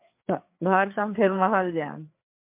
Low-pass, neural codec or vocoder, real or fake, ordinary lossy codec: 3.6 kHz; codec, 16 kHz, 4 kbps, FreqCodec, larger model; fake; MP3, 32 kbps